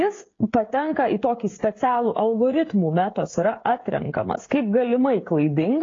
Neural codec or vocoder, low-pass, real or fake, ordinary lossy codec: codec, 16 kHz, 16 kbps, FreqCodec, smaller model; 7.2 kHz; fake; AAC, 32 kbps